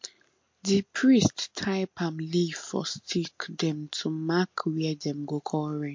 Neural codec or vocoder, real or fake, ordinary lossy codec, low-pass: none; real; MP3, 48 kbps; 7.2 kHz